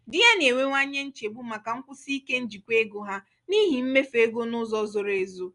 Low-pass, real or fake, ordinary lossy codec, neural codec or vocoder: 10.8 kHz; real; none; none